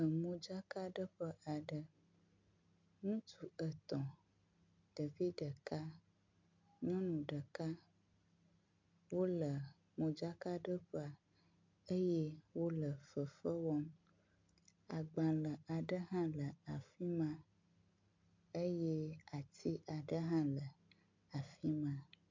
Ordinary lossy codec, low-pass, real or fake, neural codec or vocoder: MP3, 64 kbps; 7.2 kHz; real; none